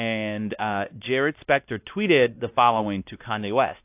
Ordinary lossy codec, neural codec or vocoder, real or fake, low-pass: AAC, 32 kbps; codec, 16 kHz, 1 kbps, X-Codec, WavLM features, trained on Multilingual LibriSpeech; fake; 3.6 kHz